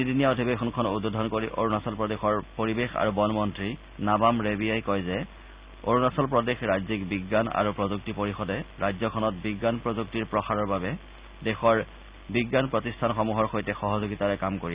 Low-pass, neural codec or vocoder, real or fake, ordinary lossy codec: 3.6 kHz; none; real; Opus, 64 kbps